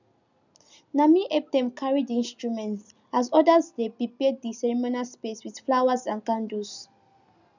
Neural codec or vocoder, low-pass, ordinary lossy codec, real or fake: none; 7.2 kHz; none; real